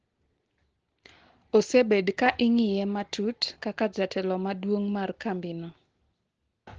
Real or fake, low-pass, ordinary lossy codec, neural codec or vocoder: real; 7.2 kHz; Opus, 16 kbps; none